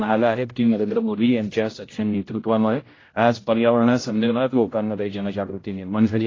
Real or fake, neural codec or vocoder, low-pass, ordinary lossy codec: fake; codec, 16 kHz, 0.5 kbps, X-Codec, HuBERT features, trained on general audio; 7.2 kHz; AAC, 32 kbps